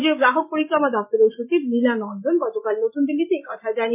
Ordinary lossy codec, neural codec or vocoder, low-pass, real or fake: MP3, 24 kbps; none; 3.6 kHz; real